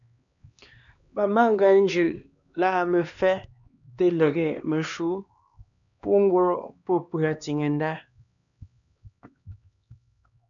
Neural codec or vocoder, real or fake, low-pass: codec, 16 kHz, 2 kbps, X-Codec, HuBERT features, trained on LibriSpeech; fake; 7.2 kHz